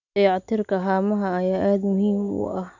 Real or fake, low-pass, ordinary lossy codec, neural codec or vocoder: real; 7.2 kHz; none; none